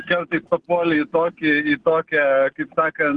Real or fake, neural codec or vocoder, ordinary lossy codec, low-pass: real; none; Opus, 16 kbps; 10.8 kHz